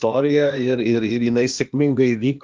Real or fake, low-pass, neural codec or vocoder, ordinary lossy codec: fake; 7.2 kHz; codec, 16 kHz, 0.8 kbps, ZipCodec; Opus, 32 kbps